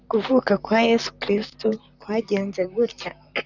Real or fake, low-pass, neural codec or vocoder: fake; 7.2 kHz; vocoder, 44.1 kHz, 128 mel bands, Pupu-Vocoder